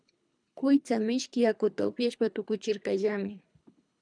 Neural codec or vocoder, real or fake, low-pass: codec, 24 kHz, 3 kbps, HILCodec; fake; 9.9 kHz